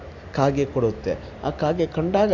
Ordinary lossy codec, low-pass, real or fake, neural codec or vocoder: AAC, 48 kbps; 7.2 kHz; real; none